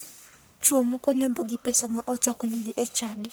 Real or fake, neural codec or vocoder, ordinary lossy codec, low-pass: fake; codec, 44.1 kHz, 1.7 kbps, Pupu-Codec; none; none